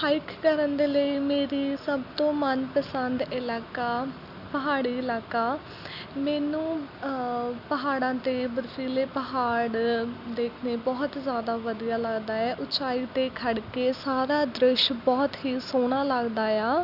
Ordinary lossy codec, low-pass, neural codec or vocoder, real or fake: none; 5.4 kHz; none; real